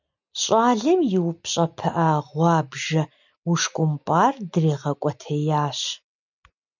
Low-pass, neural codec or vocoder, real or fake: 7.2 kHz; none; real